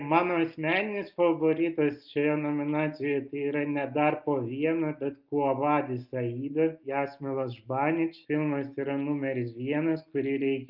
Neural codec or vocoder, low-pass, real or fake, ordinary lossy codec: none; 5.4 kHz; real; Opus, 24 kbps